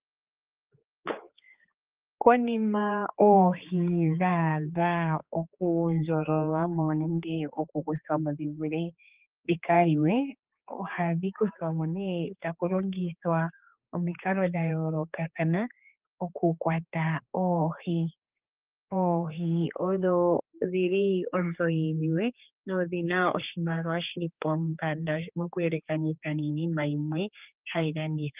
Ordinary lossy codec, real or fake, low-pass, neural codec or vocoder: Opus, 32 kbps; fake; 3.6 kHz; codec, 16 kHz, 4 kbps, X-Codec, HuBERT features, trained on general audio